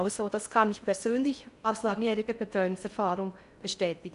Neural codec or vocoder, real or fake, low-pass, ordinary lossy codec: codec, 16 kHz in and 24 kHz out, 0.6 kbps, FocalCodec, streaming, 4096 codes; fake; 10.8 kHz; none